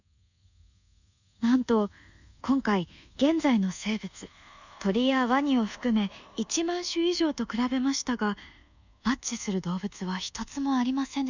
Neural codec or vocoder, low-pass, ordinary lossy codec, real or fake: codec, 24 kHz, 1.2 kbps, DualCodec; 7.2 kHz; none; fake